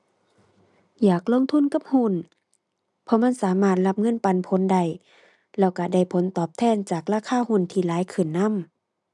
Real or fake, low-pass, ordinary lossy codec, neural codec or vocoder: real; 10.8 kHz; none; none